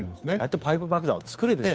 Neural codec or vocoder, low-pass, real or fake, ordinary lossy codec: codec, 16 kHz, 2 kbps, FunCodec, trained on Chinese and English, 25 frames a second; none; fake; none